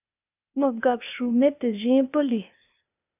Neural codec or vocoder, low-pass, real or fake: codec, 16 kHz, 0.8 kbps, ZipCodec; 3.6 kHz; fake